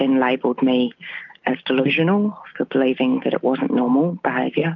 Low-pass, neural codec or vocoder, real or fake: 7.2 kHz; none; real